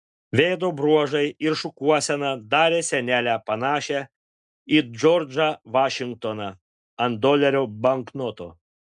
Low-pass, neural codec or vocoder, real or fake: 10.8 kHz; none; real